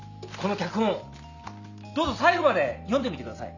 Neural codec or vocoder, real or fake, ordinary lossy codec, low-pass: none; real; none; 7.2 kHz